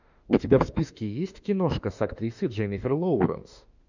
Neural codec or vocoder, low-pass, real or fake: autoencoder, 48 kHz, 32 numbers a frame, DAC-VAE, trained on Japanese speech; 7.2 kHz; fake